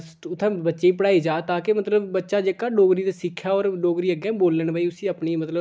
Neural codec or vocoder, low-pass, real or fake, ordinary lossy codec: none; none; real; none